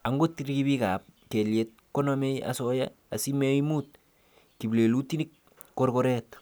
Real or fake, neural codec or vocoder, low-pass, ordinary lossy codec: real; none; none; none